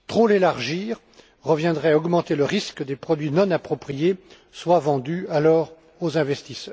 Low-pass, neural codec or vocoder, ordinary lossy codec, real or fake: none; none; none; real